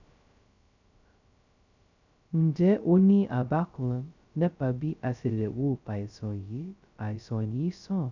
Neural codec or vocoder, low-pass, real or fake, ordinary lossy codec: codec, 16 kHz, 0.2 kbps, FocalCodec; 7.2 kHz; fake; none